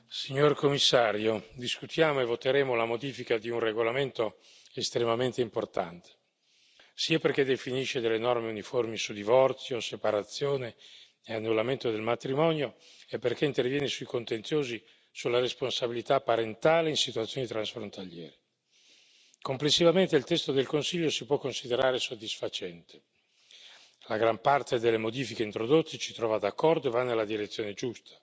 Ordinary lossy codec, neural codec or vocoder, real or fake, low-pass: none; none; real; none